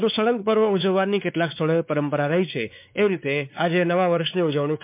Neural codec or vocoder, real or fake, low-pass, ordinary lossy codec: codec, 16 kHz, 4 kbps, X-Codec, WavLM features, trained on Multilingual LibriSpeech; fake; 3.6 kHz; MP3, 32 kbps